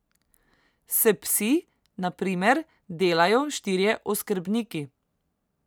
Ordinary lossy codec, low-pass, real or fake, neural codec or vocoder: none; none; real; none